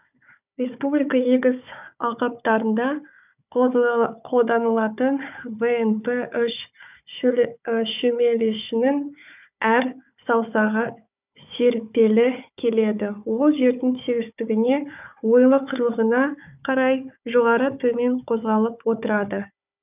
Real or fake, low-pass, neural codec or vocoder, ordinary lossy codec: fake; 3.6 kHz; codec, 16 kHz, 4 kbps, FunCodec, trained on Chinese and English, 50 frames a second; none